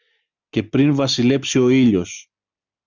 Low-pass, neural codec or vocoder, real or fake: 7.2 kHz; none; real